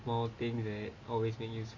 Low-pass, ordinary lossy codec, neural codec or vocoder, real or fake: 7.2 kHz; MP3, 32 kbps; none; real